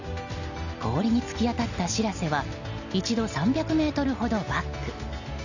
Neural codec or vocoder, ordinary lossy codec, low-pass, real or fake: none; none; 7.2 kHz; real